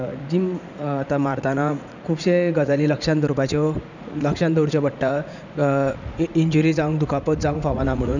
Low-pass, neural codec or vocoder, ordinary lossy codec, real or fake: 7.2 kHz; vocoder, 44.1 kHz, 80 mel bands, Vocos; none; fake